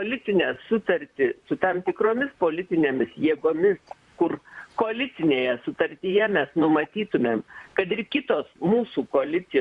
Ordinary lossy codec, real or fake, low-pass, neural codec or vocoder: Opus, 64 kbps; fake; 10.8 kHz; vocoder, 44.1 kHz, 128 mel bands, Pupu-Vocoder